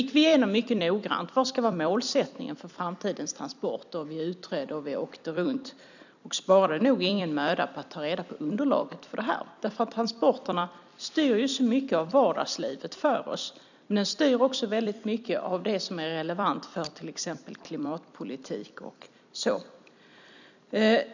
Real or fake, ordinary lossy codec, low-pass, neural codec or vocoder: fake; none; 7.2 kHz; vocoder, 44.1 kHz, 128 mel bands every 256 samples, BigVGAN v2